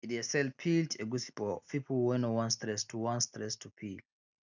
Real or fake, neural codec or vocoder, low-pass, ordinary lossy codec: real; none; 7.2 kHz; none